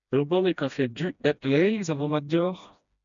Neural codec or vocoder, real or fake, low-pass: codec, 16 kHz, 1 kbps, FreqCodec, smaller model; fake; 7.2 kHz